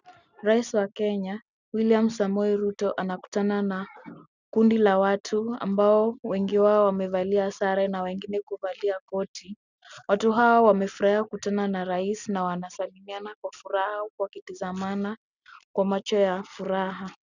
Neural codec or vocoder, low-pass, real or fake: none; 7.2 kHz; real